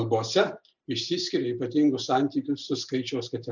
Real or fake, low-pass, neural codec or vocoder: real; 7.2 kHz; none